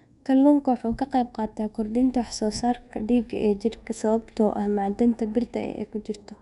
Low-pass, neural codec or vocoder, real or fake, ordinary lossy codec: 10.8 kHz; codec, 24 kHz, 1.2 kbps, DualCodec; fake; none